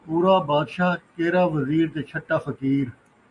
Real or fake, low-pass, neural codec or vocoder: real; 10.8 kHz; none